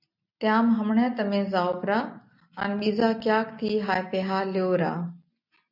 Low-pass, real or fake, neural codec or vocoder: 5.4 kHz; real; none